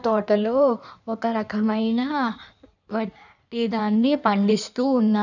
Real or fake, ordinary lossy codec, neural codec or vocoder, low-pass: fake; none; codec, 16 kHz in and 24 kHz out, 1.1 kbps, FireRedTTS-2 codec; 7.2 kHz